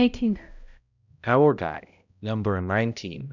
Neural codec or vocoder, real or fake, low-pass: codec, 16 kHz, 0.5 kbps, X-Codec, HuBERT features, trained on balanced general audio; fake; 7.2 kHz